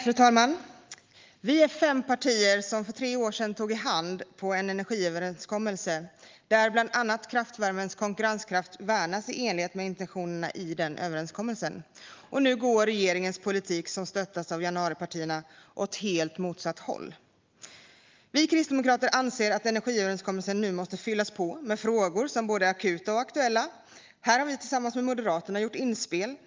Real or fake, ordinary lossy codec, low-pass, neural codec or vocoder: real; Opus, 32 kbps; 7.2 kHz; none